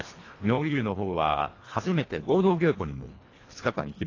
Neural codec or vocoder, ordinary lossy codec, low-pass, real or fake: codec, 24 kHz, 1.5 kbps, HILCodec; AAC, 32 kbps; 7.2 kHz; fake